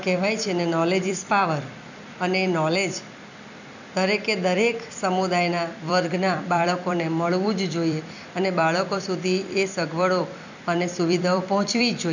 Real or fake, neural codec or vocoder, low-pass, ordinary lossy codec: fake; vocoder, 44.1 kHz, 128 mel bands every 256 samples, BigVGAN v2; 7.2 kHz; none